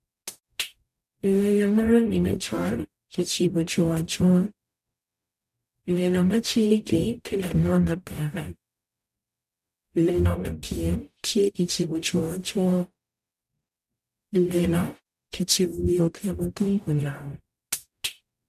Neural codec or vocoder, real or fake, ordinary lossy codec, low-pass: codec, 44.1 kHz, 0.9 kbps, DAC; fake; none; 14.4 kHz